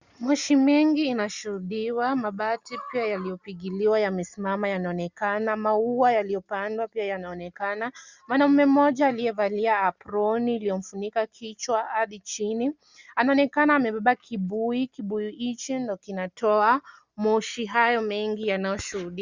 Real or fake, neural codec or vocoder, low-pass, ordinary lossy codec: real; none; 7.2 kHz; Opus, 64 kbps